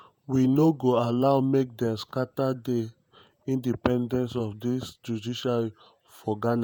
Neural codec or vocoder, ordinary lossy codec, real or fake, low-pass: vocoder, 48 kHz, 128 mel bands, Vocos; none; fake; none